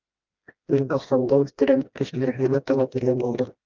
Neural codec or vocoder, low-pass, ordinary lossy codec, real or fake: codec, 16 kHz, 1 kbps, FreqCodec, smaller model; 7.2 kHz; Opus, 24 kbps; fake